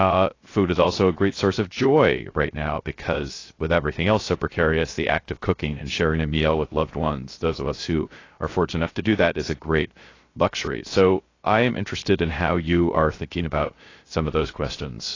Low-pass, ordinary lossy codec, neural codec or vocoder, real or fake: 7.2 kHz; AAC, 32 kbps; codec, 16 kHz, 0.3 kbps, FocalCodec; fake